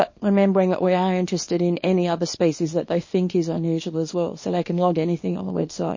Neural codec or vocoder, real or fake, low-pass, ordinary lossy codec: codec, 24 kHz, 0.9 kbps, WavTokenizer, small release; fake; 7.2 kHz; MP3, 32 kbps